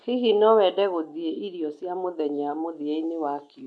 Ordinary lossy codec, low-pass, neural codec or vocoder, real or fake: none; none; none; real